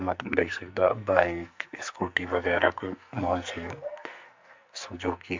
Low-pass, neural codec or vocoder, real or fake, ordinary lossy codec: 7.2 kHz; codec, 44.1 kHz, 2.6 kbps, SNAC; fake; MP3, 64 kbps